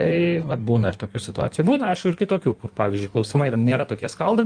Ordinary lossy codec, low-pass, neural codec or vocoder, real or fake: Opus, 32 kbps; 9.9 kHz; codec, 16 kHz in and 24 kHz out, 1.1 kbps, FireRedTTS-2 codec; fake